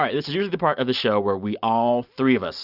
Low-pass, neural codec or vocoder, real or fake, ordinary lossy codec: 5.4 kHz; none; real; Opus, 64 kbps